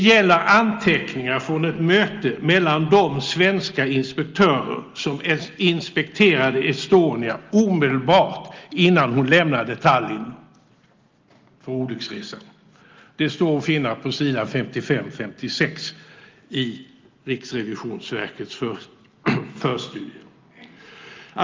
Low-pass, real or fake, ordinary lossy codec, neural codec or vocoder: 7.2 kHz; real; Opus, 32 kbps; none